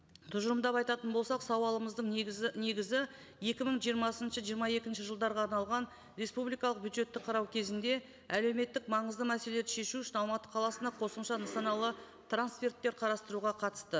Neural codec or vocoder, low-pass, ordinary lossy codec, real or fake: none; none; none; real